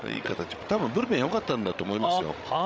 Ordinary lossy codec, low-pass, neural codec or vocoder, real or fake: none; none; codec, 16 kHz, 16 kbps, FreqCodec, larger model; fake